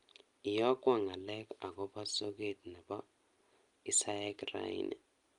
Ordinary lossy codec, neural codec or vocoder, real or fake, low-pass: Opus, 32 kbps; none; real; 10.8 kHz